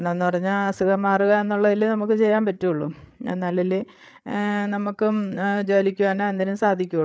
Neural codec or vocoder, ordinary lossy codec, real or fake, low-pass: codec, 16 kHz, 8 kbps, FreqCodec, larger model; none; fake; none